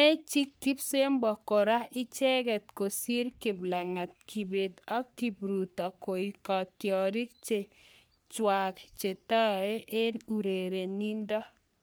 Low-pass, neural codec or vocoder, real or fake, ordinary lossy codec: none; codec, 44.1 kHz, 3.4 kbps, Pupu-Codec; fake; none